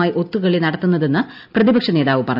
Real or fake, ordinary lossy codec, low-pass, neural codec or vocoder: real; none; 5.4 kHz; none